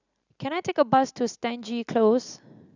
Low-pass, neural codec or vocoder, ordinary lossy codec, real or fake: 7.2 kHz; none; none; real